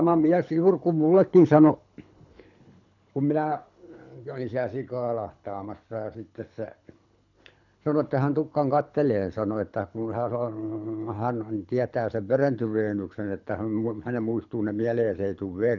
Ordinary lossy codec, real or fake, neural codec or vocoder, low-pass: none; fake; codec, 24 kHz, 6 kbps, HILCodec; 7.2 kHz